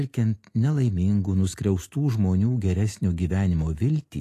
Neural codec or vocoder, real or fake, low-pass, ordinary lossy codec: none; real; 14.4 kHz; AAC, 48 kbps